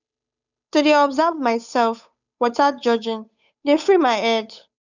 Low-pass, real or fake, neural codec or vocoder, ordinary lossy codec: 7.2 kHz; fake; codec, 16 kHz, 8 kbps, FunCodec, trained on Chinese and English, 25 frames a second; none